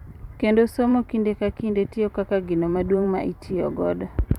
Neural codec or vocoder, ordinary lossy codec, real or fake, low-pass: vocoder, 44.1 kHz, 128 mel bands every 512 samples, BigVGAN v2; none; fake; 19.8 kHz